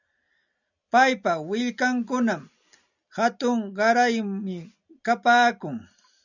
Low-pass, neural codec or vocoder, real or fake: 7.2 kHz; none; real